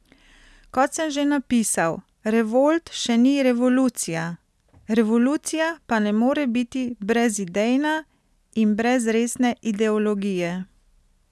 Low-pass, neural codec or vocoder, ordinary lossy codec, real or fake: none; none; none; real